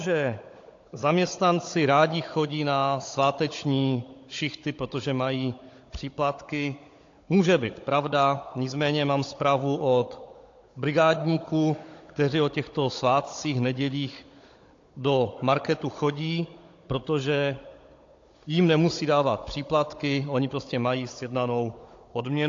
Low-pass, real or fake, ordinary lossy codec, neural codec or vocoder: 7.2 kHz; fake; AAC, 48 kbps; codec, 16 kHz, 16 kbps, FunCodec, trained on Chinese and English, 50 frames a second